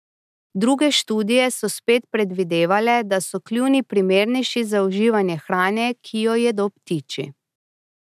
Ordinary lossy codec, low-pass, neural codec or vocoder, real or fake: none; 14.4 kHz; none; real